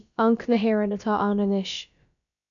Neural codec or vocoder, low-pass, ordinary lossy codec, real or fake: codec, 16 kHz, about 1 kbps, DyCAST, with the encoder's durations; 7.2 kHz; MP3, 96 kbps; fake